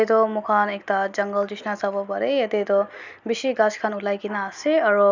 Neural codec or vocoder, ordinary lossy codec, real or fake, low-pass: none; none; real; 7.2 kHz